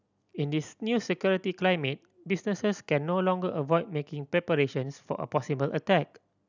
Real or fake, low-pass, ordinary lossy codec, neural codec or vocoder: real; 7.2 kHz; none; none